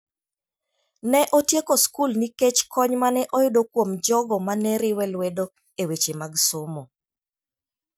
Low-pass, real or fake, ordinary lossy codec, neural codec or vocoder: none; real; none; none